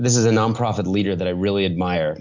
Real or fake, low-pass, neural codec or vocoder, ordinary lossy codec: real; 7.2 kHz; none; MP3, 64 kbps